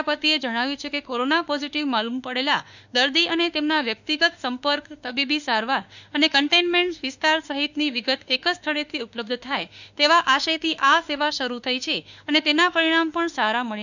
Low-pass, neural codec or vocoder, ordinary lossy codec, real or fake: 7.2 kHz; autoencoder, 48 kHz, 32 numbers a frame, DAC-VAE, trained on Japanese speech; none; fake